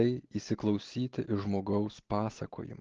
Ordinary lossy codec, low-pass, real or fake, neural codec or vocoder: Opus, 16 kbps; 7.2 kHz; real; none